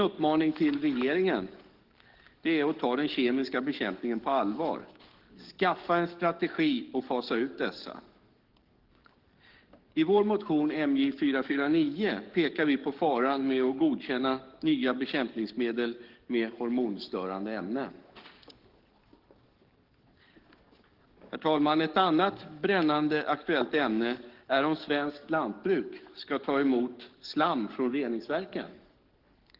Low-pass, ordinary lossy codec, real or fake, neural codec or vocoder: 5.4 kHz; Opus, 16 kbps; fake; codec, 44.1 kHz, 7.8 kbps, DAC